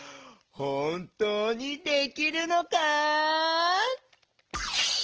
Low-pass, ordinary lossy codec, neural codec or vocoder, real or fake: 7.2 kHz; Opus, 16 kbps; none; real